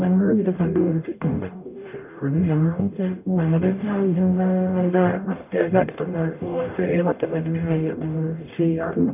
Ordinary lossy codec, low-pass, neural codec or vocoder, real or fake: none; 3.6 kHz; codec, 44.1 kHz, 0.9 kbps, DAC; fake